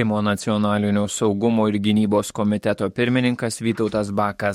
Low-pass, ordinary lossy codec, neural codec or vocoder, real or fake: 19.8 kHz; MP3, 64 kbps; codec, 44.1 kHz, 7.8 kbps, DAC; fake